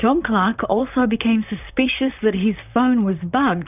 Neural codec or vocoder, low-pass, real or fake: vocoder, 44.1 kHz, 128 mel bands, Pupu-Vocoder; 3.6 kHz; fake